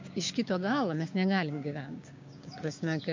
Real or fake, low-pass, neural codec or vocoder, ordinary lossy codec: fake; 7.2 kHz; codec, 16 kHz, 6 kbps, DAC; MP3, 48 kbps